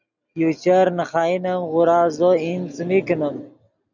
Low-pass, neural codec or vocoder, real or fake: 7.2 kHz; none; real